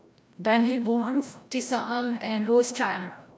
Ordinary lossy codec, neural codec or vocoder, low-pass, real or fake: none; codec, 16 kHz, 0.5 kbps, FreqCodec, larger model; none; fake